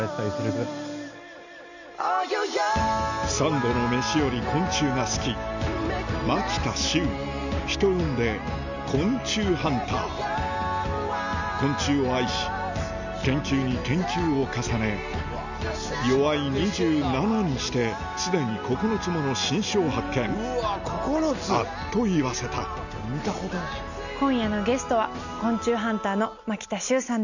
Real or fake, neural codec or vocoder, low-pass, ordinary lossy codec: real; none; 7.2 kHz; none